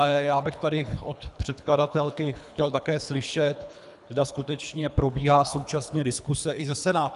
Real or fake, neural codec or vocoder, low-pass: fake; codec, 24 kHz, 3 kbps, HILCodec; 10.8 kHz